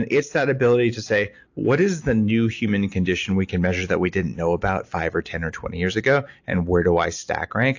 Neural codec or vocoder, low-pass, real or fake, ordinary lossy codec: none; 7.2 kHz; real; AAC, 48 kbps